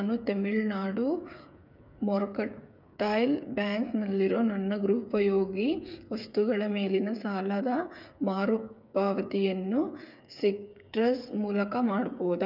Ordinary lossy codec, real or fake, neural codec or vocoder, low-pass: none; fake; codec, 16 kHz, 8 kbps, FreqCodec, smaller model; 5.4 kHz